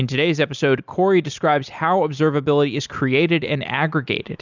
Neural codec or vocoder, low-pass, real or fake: none; 7.2 kHz; real